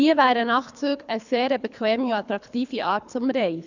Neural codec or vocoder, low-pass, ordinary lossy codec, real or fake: codec, 24 kHz, 3 kbps, HILCodec; 7.2 kHz; none; fake